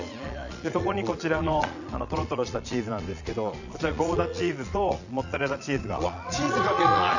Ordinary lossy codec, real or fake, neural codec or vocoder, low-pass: none; fake; vocoder, 22.05 kHz, 80 mel bands, Vocos; 7.2 kHz